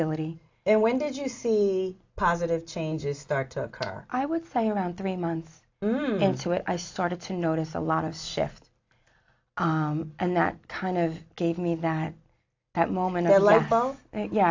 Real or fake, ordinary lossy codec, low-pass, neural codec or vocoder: real; AAC, 48 kbps; 7.2 kHz; none